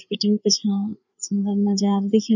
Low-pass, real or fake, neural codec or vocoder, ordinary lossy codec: 7.2 kHz; fake; codec, 16 kHz, 4 kbps, FreqCodec, larger model; none